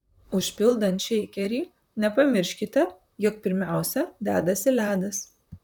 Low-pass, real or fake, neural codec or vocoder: 19.8 kHz; fake; vocoder, 44.1 kHz, 128 mel bands, Pupu-Vocoder